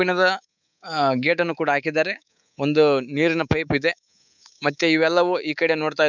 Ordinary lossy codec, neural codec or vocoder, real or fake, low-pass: none; codec, 24 kHz, 3.1 kbps, DualCodec; fake; 7.2 kHz